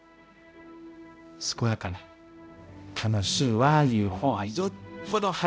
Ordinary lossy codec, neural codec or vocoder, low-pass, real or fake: none; codec, 16 kHz, 0.5 kbps, X-Codec, HuBERT features, trained on balanced general audio; none; fake